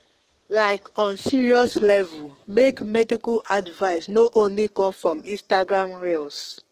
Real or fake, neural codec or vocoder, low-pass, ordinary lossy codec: fake; codec, 32 kHz, 1.9 kbps, SNAC; 14.4 kHz; Opus, 16 kbps